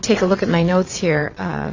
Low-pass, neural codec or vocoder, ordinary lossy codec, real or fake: 7.2 kHz; vocoder, 22.05 kHz, 80 mel bands, WaveNeXt; AAC, 48 kbps; fake